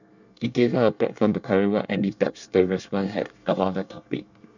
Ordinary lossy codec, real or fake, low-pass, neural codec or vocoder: none; fake; 7.2 kHz; codec, 24 kHz, 1 kbps, SNAC